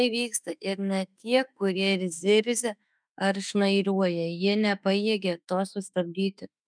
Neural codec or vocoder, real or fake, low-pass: autoencoder, 48 kHz, 32 numbers a frame, DAC-VAE, trained on Japanese speech; fake; 9.9 kHz